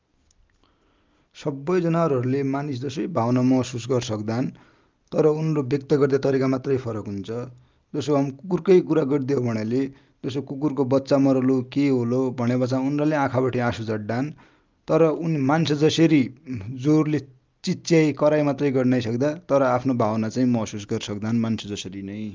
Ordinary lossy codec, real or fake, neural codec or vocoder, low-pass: Opus, 24 kbps; real; none; 7.2 kHz